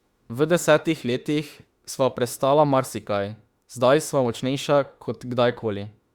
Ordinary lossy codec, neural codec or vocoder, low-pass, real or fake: Opus, 64 kbps; autoencoder, 48 kHz, 32 numbers a frame, DAC-VAE, trained on Japanese speech; 19.8 kHz; fake